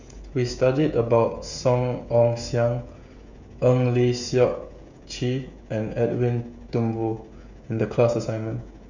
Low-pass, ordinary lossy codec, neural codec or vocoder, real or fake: 7.2 kHz; Opus, 64 kbps; codec, 16 kHz, 16 kbps, FreqCodec, smaller model; fake